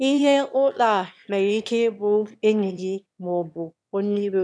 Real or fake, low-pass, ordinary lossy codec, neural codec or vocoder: fake; none; none; autoencoder, 22.05 kHz, a latent of 192 numbers a frame, VITS, trained on one speaker